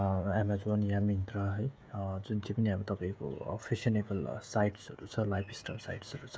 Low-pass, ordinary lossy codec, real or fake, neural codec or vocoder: none; none; fake; codec, 16 kHz, 16 kbps, FreqCodec, smaller model